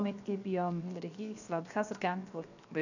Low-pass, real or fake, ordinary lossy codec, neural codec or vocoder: 7.2 kHz; fake; none; codec, 16 kHz, 0.7 kbps, FocalCodec